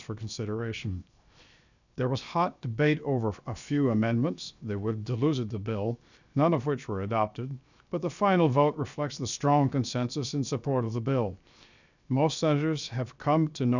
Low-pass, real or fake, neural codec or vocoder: 7.2 kHz; fake; codec, 16 kHz, 0.7 kbps, FocalCodec